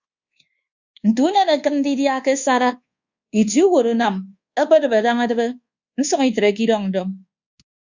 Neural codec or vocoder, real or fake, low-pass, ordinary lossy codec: codec, 24 kHz, 1.2 kbps, DualCodec; fake; 7.2 kHz; Opus, 64 kbps